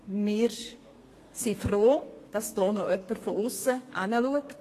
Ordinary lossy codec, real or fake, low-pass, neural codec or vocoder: AAC, 48 kbps; fake; 14.4 kHz; codec, 32 kHz, 1.9 kbps, SNAC